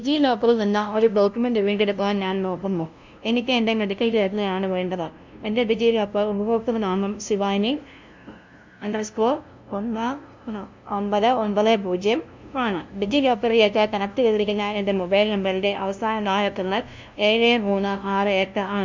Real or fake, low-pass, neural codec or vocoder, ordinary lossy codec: fake; 7.2 kHz; codec, 16 kHz, 0.5 kbps, FunCodec, trained on LibriTTS, 25 frames a second; MP3, 64 kbps